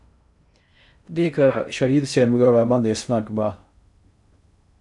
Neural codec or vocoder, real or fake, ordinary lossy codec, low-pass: codec, 16 kHz in and 24 kHz out, 0.6 kbps, FocalCodec, streaming, 2048 codes; fake; MP3, 96 kbps; 10.8 kHz